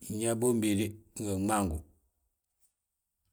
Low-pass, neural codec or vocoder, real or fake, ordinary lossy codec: none; none; real; none